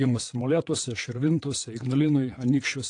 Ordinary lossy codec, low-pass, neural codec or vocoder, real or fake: AAC, 48 kbps; 9.9 kHz; vocoder, 22.05 kHz, 80 mel bands, WaveNeXt; fake